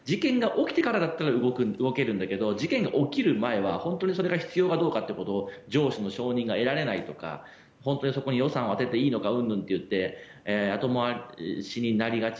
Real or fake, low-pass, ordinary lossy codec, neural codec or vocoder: real; none; none; none